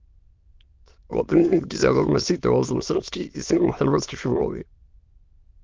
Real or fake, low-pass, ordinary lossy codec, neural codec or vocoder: fake; 7.2 kHz; Opus, 32 kbps; autoencoder, 22.05 kHz, a latent of 192 numbers a frame, VITS, trained on many speakers